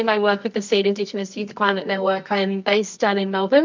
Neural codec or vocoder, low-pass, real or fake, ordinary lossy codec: codec, 24 kHz, 0.9 kbps, WavTokenizer, medium music audio release; 7.2 kHz; fake; MP3, 64 kbps